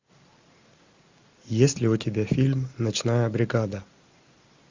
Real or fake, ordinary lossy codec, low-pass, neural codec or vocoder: real; MP3, 64 kbps; 7.2 kHz; none